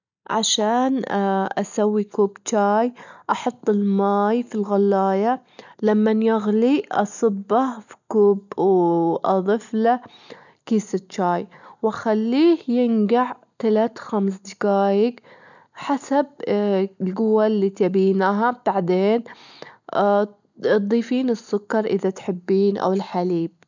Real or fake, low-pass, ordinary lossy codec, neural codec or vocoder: real; 7.2 kHz; none; none